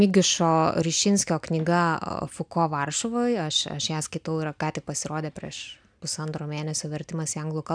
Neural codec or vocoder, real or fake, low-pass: none; real; 9.9 kHz